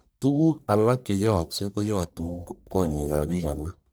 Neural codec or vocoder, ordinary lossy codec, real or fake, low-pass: codec, 44.1 kHz, 1.7 kbps, Pupu-Codec; none; fake; none